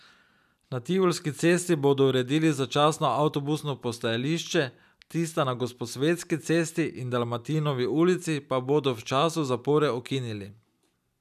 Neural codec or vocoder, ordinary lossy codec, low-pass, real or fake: none; none; 14.4 kHz; real